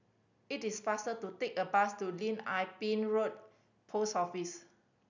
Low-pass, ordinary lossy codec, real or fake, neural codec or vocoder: 7.2 kHz; none; real; none